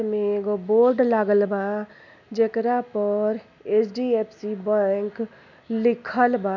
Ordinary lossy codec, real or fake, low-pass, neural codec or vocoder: none; real; 7.2 kHz; none